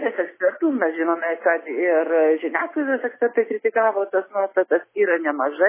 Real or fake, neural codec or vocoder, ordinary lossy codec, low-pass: real; none; MP3, 16 kbps; 3.6 kHz